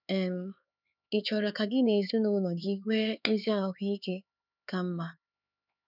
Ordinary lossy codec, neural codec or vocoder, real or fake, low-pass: none; codec, 16 kHz, 4 kbps, X-Codec, HuBERT features, trained on LibriSpeech; fake; 5.4 kHz